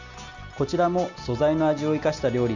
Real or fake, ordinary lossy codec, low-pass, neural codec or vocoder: real; none; 7.2 kHz; none